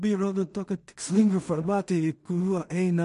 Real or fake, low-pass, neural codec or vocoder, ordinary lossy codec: fake; 10.8 kHz; codec, 16 kHz in and 24 kHz out, 0.4 kbps, LongCat-Audio-Codec, two codebook decoder; MP3, 48 kbps